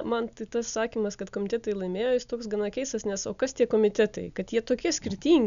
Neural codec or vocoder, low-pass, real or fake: none; 7.2 kHz; real